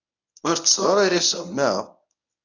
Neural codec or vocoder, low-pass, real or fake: codec, 24 kHz, 0.9 kbps, WavTokenizer, medium speech release version 1; 7.2 kHz; fake